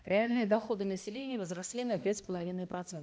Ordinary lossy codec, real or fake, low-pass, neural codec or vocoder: none; fake; none; codec, 16 kHz, 1 kbps, X-Codec, HuBERT features, trained on balanced general audio